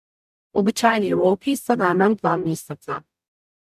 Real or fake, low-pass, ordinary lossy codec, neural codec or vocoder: fake; 14.4 kHz; MP3, 96 kbps; codec, 44.1 kHz, 0.9 kbps, DAC